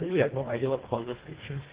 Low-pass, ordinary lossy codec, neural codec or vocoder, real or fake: 3.6 kHz; Opus, 16 kbps; codec, 24 kHz, 1.5 kbps, HILCodec; fake